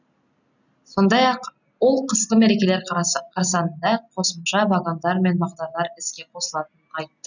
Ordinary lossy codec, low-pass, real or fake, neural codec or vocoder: none; 7.2 kHz; real; none